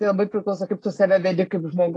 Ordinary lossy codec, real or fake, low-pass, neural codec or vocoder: AAC, 32 kbps; real; 10.8 kHz; none